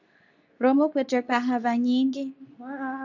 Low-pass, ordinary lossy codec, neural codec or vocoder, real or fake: 7.2 kHz; AAC, 48 kbps; codec, 24 kHz, 0.9 kbps, WavTokenizer, medium speech release version 2; fake